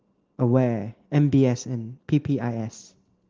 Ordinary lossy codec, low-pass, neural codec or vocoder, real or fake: Opus, 16 kbps; 7.2 kHz; none; real